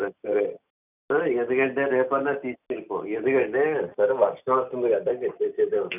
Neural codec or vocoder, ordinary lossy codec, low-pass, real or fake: none; none; 3.6 kHz; real